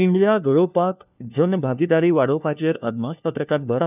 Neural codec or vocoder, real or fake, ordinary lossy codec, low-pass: codec, 16 kHz, 1 kbps, FunCodec, trained on LibriTTS, 50 frames a second; fake; none; 3.6 kHz